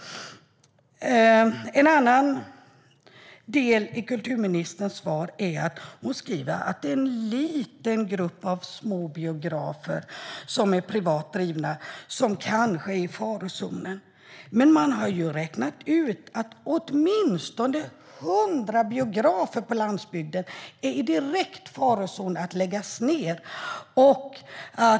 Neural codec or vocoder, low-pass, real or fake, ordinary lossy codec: none; none; real; none